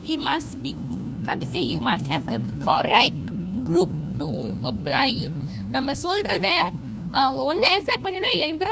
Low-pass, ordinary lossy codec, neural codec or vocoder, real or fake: none; none; codec, 16 kHz, 1 kbps, FunCodec, trained on LibriTTS, 50 frames a second; fake